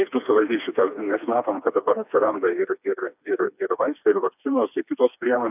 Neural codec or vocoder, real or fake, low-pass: codec, 16 kHz, 2 kbps, FreqCodec, smaller model; fake; 3.6 kHz